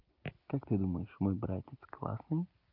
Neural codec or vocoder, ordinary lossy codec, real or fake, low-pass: none; Opus, 32 kbps; real; 5.4 kHz